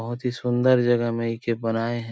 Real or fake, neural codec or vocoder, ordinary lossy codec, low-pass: real; none; none; none